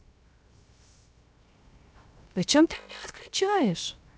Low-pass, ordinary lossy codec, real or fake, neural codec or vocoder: none; none; fake; codec, 16 kHz, 0.3 kbps, FocalCodec